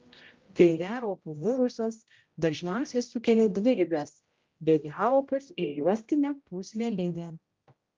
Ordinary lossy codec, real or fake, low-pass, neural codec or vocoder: Opus, 24 kbps; fake; 7.2 kHz; codec, 16 kHz, 0.5 kbps, X-Codec, HuBERT features, trained on general audio